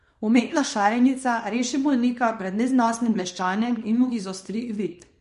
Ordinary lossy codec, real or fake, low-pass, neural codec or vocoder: MP3, 48 kbps; fake; 10.8 kHz; codec, 24 kHz, 0.9 kbps, WavTokenizer, small release